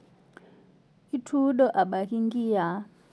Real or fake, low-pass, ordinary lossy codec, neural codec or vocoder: real; none; none; none